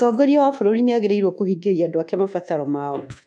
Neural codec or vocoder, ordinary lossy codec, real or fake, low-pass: codec, 24 kHz, 1.2 kbps, DualCodec; none; fake; none